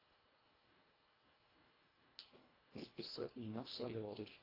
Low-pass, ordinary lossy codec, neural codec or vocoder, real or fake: 5.4 kHz; AAC, 24 kbps; codec, 24 kHz, 1.5 kbps, HILCodec; fake